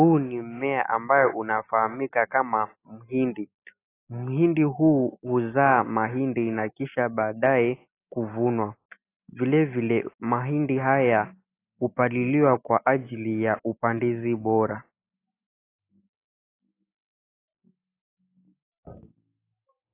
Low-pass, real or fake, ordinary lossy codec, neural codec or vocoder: 3.6 kHz; real; AAC, 24 kbps; none